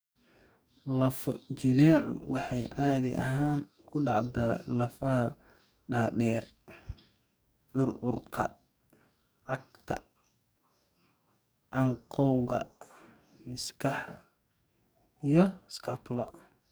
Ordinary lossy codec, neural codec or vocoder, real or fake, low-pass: none; codec, 44.1 kHz, 2.6 kbps, DAC; fake; none